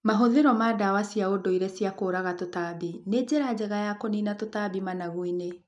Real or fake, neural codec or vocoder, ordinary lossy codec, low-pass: real; none; none; 10.8 kHz